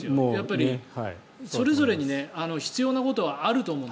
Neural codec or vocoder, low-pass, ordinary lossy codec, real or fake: none; none; none; real